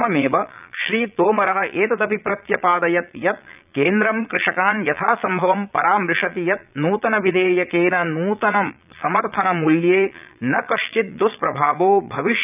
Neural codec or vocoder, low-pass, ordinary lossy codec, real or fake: vocoder, 22.05 kHz, 80 mel bands, Vocos; 3.6 kHz; none; fake